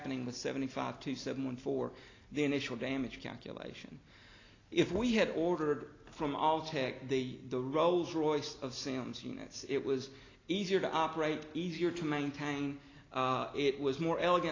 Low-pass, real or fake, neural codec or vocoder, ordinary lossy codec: 7.2 kHz; real; none; AAC, 32 kbps